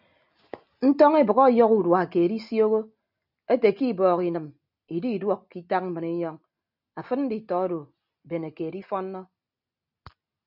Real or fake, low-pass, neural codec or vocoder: real; 5.4 kHz; none